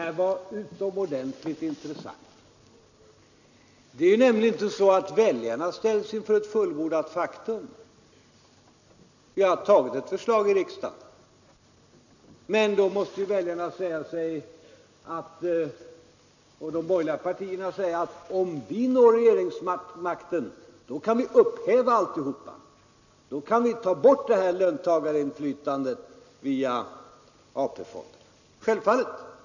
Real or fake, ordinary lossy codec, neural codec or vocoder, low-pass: real; none; none; 7.2 kHz